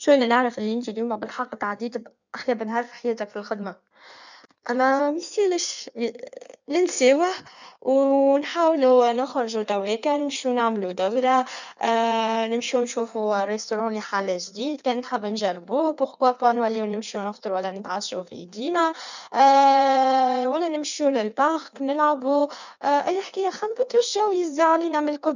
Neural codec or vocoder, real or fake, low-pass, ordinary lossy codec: codec, 16 kHz in and 24 kHz out, 1.1 kbps, FireRedTTS-2 codec; fake; 7.2 kHz; none